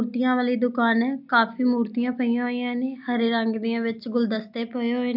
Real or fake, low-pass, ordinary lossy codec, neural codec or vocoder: real; 5.4 kHz; none; none